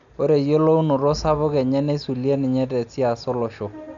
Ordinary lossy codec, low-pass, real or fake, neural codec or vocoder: none; 7.2 kHz; real; none